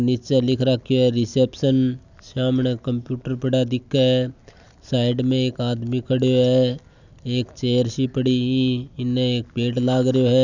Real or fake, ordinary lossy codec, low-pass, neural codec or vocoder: real; none; 7.2 kHz; none